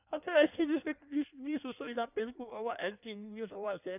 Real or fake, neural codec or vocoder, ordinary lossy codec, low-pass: fake; codec, 16 kHz in and 24 kHz out, 1.1 kbps, FireRedTTS-2 codec; none; 3.6 kHz